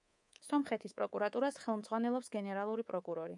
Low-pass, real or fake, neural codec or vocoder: 10.8 kHz; fake; codec, 24 kHz, 3.1 kbps, DualCodec